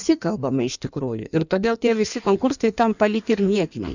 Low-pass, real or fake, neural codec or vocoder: 7.2 kHz; fake; codec, 16 kHz in and 24 kHz out, 1.1 kbps, FireRedTTS-2 codec